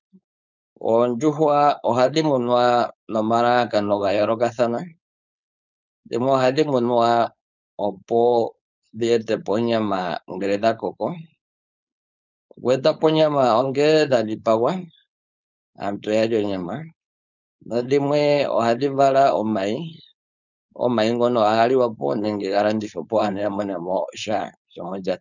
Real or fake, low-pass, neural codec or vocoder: fake; 7.2 kHz; codec, 16 kHz, 4.8 kbps, FACodec